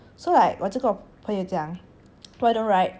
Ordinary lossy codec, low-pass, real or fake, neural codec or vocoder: none; none; real; none